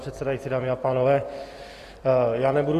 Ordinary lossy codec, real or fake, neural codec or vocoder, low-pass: AAC, 48 kbps; fake; vocoder, 44.1 kHz, 128 mel bands every 256 samples, BigVGAN v2; 14.4 kHz